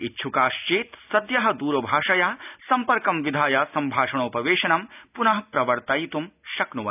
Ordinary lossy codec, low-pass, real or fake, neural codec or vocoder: none; 3.6 kHz; real; none